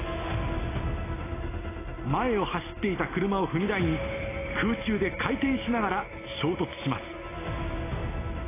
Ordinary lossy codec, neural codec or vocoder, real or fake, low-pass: AAC, 16 kbps; none; real; 3.6 kHz